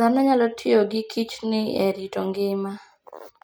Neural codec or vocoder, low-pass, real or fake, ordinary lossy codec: none; none; real; none